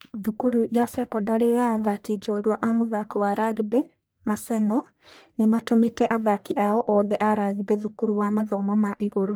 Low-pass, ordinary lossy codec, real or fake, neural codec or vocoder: none; none; fake; codec, 44.1 kHz, 1.7 kbps, Pupu-Codec